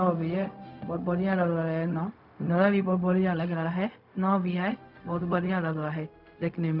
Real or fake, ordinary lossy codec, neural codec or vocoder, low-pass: fake; none; codec, 16 kHz, 0.4 kbps, LongCat-Audio-Codec; 5.4 kHz